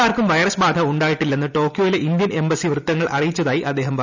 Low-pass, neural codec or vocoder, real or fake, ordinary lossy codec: 7.2 kHz; none; real; none